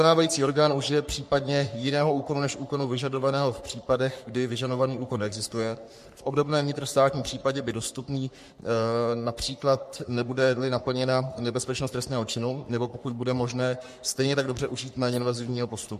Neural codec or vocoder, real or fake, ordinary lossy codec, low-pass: codec, 44.1 kHz, 3.4 kbps, Pupu-Codec; fake; MP3, 64 kbps; 14.4 kHz